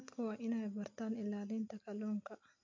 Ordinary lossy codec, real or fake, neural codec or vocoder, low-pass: MP3, 48 kbps; real; none; 7.2 kHz